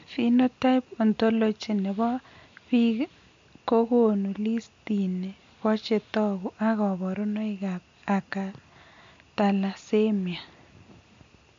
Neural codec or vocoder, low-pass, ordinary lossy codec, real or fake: none; 7.2 kHz; MP3, 48 kbps; real